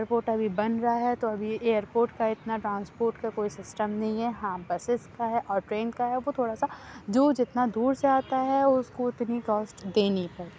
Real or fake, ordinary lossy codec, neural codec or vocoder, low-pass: real; none; none; none